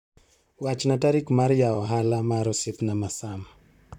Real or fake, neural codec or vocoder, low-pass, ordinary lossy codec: fake; vocoder, 44.1 kHz, 128 mel bands, Pupu-Vocoder; 19.8 kHz; none